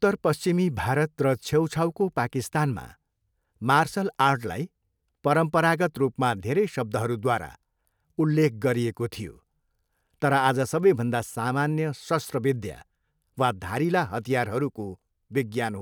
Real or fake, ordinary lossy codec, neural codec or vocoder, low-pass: real; none; none; none